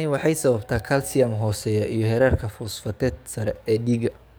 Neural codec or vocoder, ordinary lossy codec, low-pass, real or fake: codec, 44.1 kHz, 7.8 kbps, DAC; none; none; fake